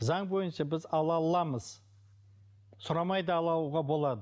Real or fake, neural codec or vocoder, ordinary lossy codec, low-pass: real; none; none; none